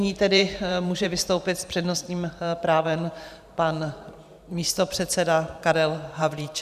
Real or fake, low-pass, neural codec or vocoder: fake; 14.4 kHz; vocoder, 44.1 kHz, 128 mel bands every 512 samples, BigVGAN v2